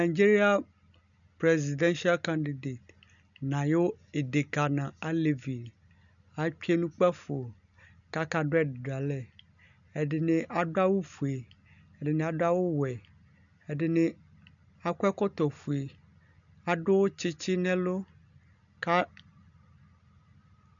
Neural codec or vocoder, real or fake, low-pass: none; real; 7.2 kHz